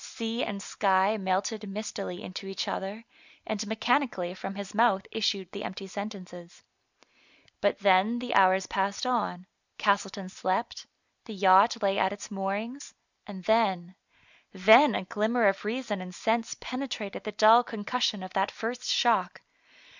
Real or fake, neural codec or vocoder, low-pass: real; none; 7.2 kHz